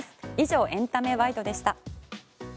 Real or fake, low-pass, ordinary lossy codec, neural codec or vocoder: real; none; none; none